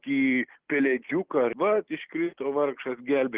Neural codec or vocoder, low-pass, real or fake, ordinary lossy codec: none; 3.6 kHz; real; Opus, 16 kbps